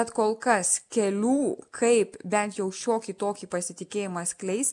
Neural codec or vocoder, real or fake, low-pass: none; real; 10.8 kHz